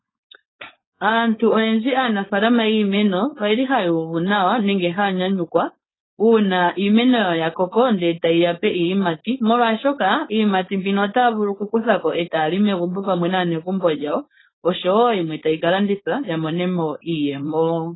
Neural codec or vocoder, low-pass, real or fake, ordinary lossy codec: codec, 16 kHz, 4.8 kbps, FACodec; 7.2 kHz; fake; AAC, 16 kbps